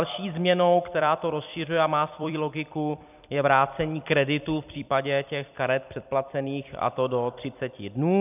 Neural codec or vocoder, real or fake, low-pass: vocoder, 44.1 kHz, 128 mel bands every 256 samples, BigVGAN v2; fake; 3.6 kHz